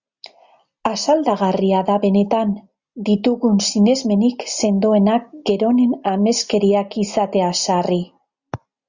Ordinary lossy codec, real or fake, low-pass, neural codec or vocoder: Opus, 64 kbps; real; 7.2 kHz; none